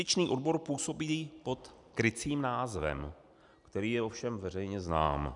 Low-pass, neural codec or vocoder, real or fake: 10.8 kHz; none; real